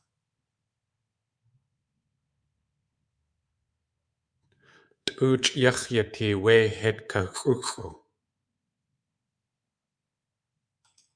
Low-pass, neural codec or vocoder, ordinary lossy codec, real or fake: 9.9 kHz; codec, 24 kHz, 3.1 kbps, DualCodec; Opus, 64 kbps; fake